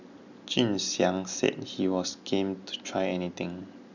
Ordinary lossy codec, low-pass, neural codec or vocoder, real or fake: none; 7.2 kHz; none; real